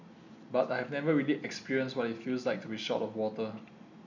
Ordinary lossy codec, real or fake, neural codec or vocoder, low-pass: none; real; none; 7.2 kHz